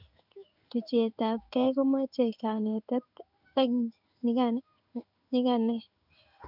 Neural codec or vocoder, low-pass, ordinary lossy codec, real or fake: codec, 16 kHz in and 24 kHz out, 1 kbps, XY-Tokenizer; 5.4 kHz; none; fake